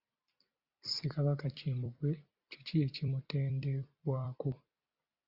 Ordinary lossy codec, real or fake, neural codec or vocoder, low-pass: Opus, 64 kbps; fake; vocoder, 44.1 kHz, 128 mel bands every 256 samples, BigVGAN v2; 5.4 kHz